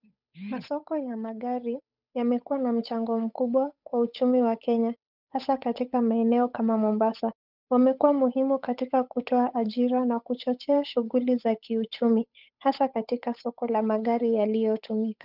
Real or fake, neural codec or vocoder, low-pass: fake; codec, 16 kHz, 8 kbps, FunCodec, trained on Chinese and English, 25 frames a second; 5.4 kHz